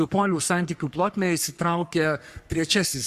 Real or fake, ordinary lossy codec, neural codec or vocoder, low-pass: fake; Opus, 64 kbps; codec, 44.1 kHz, 3.4 kbps, Pupu-Codec; 14.4 kHz